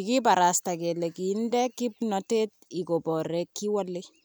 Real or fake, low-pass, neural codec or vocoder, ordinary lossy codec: real; none; none; none